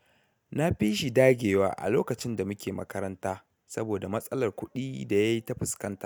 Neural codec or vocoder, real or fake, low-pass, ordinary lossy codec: none; real; none; none